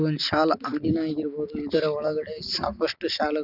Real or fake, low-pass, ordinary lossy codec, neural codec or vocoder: real; 5.4 kHz; none; none